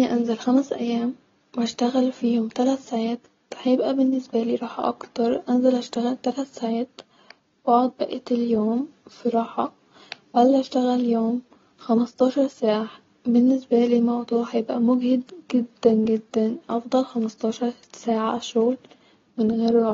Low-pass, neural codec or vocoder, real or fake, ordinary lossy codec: 7.2 kHz; none; real; AAC, 24 kbps